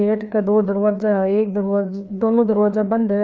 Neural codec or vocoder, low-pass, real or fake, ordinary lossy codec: codec, 16 kHz, 2 kbps, FreqCodec, larger model; none; fake; none